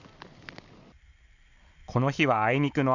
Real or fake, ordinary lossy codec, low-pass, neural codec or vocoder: real; Opus, 64 kbps; 7.2 kHz; none